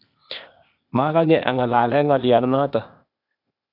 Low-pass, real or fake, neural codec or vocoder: 5.4 kHz; fake; codec, 16 kHz, 0.8 kbps, ZipCodec